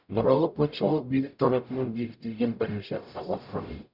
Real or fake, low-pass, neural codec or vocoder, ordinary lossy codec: fake; 5.4 kHz; codec, 44.1 kHz, 0.9 kbps, DAC; none